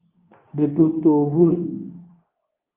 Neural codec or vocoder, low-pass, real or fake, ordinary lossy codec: codec, 24 kHz, 0.9 kbps, WavTokenizer, medium speech release version 2; 3.6 kHz; fake; Opus, 24 kbps